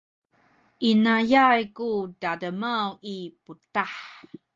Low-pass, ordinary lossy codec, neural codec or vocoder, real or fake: 7.2 kHz; Opus, 24 kbps; none; real